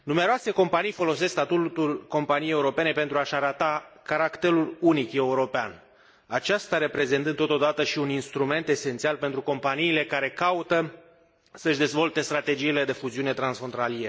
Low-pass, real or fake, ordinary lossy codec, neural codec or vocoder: none; real; none; none